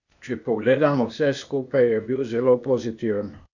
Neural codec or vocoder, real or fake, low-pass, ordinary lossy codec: codec, 16 kHz, 0.8 kbps, ZipCodec; fake; 7.2 kHz; none